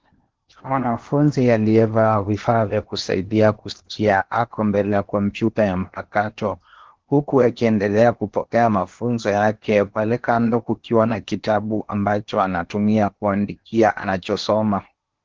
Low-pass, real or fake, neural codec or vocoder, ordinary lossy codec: 7.2 kHz; fake; codec, 16 kHz in and 24 kHz out, 0.8 kbps, FocalCodec, streaming, 65536 codes; Opus, 16 kbps